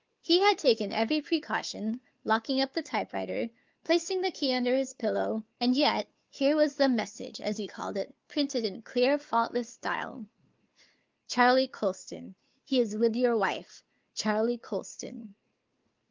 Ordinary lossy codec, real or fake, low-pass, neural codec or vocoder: Opus, 32 kbps; fake; 7.2 kHz; codec, 16 kHz, 4 kbps, FunCodec, trained on Chinese and English, 50 frames a second